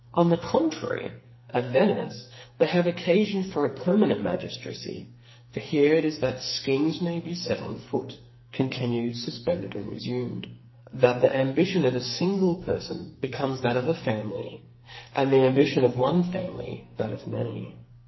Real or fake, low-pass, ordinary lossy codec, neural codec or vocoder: fake; 7.2 kHz; MP3, 24 kbps; codec, 44.1 kHz, 2.6 kbps, SNAC